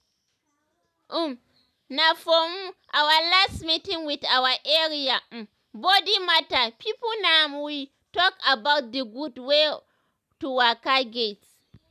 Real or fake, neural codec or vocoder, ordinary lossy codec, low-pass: real; none; none; 14.4 kHz